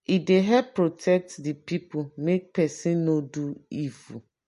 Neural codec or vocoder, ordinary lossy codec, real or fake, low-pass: none; MP3, 48 kbps; real; 14.4 kHz